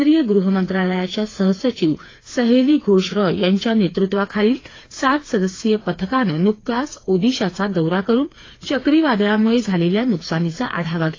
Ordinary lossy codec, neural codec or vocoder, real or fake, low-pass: AAC, 32 kbps; codec, 16 kHz, 4 kbps, FreqCodec, smaller model; fake; 7.2 kHz